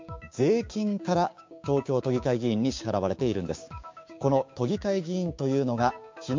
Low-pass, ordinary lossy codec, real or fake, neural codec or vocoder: 7.2 kHz; MP3, 48 kbps; fake; codec, 16 kHz, 6 kbps, DAC